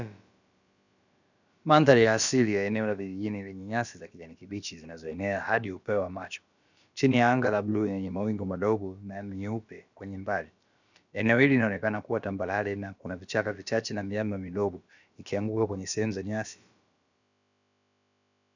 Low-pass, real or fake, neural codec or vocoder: 7.2 kHz; fake; codec, 16 kHz, about 1 kbps, DyCAST, with the encoder's durations